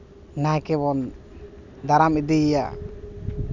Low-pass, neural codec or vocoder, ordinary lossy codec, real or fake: 7.2 kHz; none; none; real